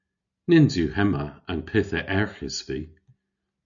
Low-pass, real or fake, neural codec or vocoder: 7.2 kHz; real; none